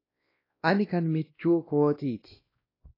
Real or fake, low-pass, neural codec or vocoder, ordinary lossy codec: fake; 5.4 kHz; codec, 16 kHz, 1 kbps, X-Codec, WavLM features, trained on Multilingual LibriSpeech; AAC, 24 kbps